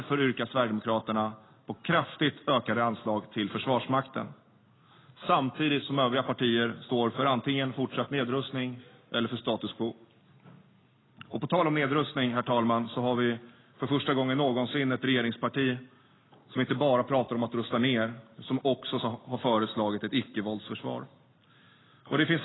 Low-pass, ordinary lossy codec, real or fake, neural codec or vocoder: 7.2 kHz; AAC, 16 kbps; real; none